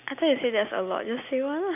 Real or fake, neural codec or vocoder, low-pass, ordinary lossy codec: real; none; 3.6 kHz; none